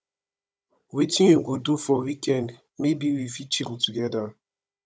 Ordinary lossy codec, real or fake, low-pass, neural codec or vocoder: none; fake; none; codec, 16 kHz, 16 kbps, FunCodec, trained on Chinese and English, 50 frames a second